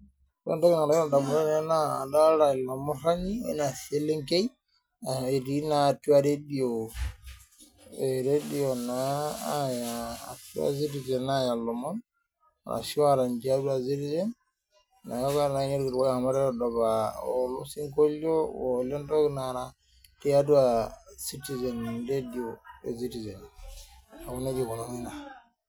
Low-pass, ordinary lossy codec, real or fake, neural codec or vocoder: none; none; real; none